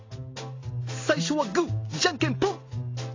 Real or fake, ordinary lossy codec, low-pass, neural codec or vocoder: real; AAC, 32 kbps; 7.2 kHz; none